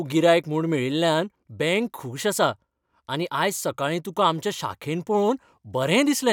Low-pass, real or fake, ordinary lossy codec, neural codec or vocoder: 19.8 kHz; real; none; none